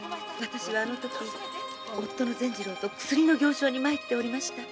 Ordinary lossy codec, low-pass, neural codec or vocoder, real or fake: none; none; none; real